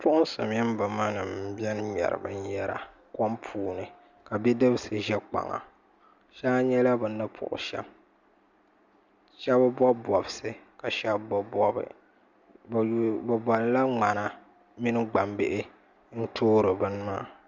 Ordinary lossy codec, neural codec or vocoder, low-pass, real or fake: Opus, 64 kbps; none; 7.2 kHz; real